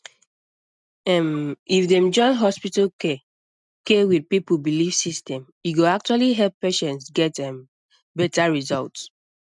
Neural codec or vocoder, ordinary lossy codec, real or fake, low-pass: none; none; real; 10.8 kHz